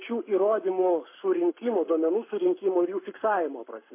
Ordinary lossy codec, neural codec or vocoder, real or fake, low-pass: MP3, 24 kbps; codec, 44.1 kHz, 7.8 kbps, Pupu-Codec; fake; 3.6 kHz